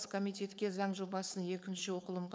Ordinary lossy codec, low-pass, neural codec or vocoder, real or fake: none; none; codec, 16 kHz, 4.8 kbps, FACodec; fake